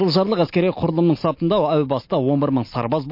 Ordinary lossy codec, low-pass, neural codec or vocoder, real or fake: MP3, 32 kbps; 5.4 kHz; none; real